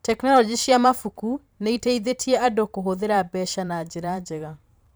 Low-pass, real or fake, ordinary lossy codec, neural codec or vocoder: none; fake; none; vocoder, 44.1 kHz, 128 mel bands every 512 samples, BigVGAN v2